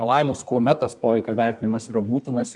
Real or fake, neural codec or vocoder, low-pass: fake; codec, 24 kHz, 1 kbps, SNAC; 10.8 kHz